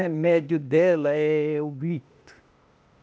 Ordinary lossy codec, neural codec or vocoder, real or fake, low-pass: none; codec, 16 kHz, 0.8 kbps, ZipCodec; fake; none